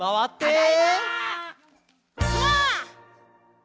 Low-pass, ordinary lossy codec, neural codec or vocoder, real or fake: none; none; none; real